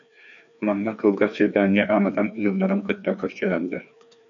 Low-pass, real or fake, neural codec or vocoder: 7.2 kHz; fake; codec, 16 kHz, 2 kbps, FreqCodec, larger model